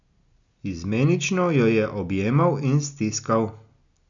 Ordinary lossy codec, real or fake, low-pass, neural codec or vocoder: none; real; 7.2 kHz; none